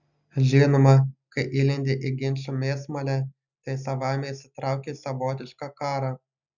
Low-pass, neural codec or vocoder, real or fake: 7.2 kHz; none; real